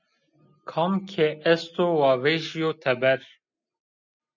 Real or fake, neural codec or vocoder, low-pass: real; none; 7.2 kHz